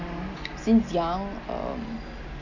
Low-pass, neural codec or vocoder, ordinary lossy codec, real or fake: 7.2 kHz; none; none; real